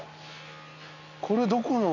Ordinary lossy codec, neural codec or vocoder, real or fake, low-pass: none; none; real; 7.2 kHz